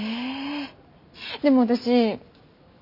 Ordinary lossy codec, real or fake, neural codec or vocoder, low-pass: none; real; none; 5.4 kHz